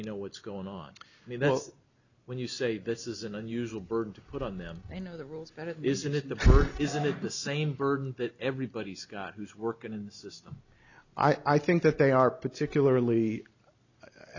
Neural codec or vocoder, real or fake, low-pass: none; real; 7.2 kHz